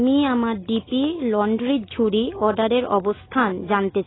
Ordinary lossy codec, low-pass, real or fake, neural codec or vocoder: AAC, 16 kbps; 7.2 kHz; real; none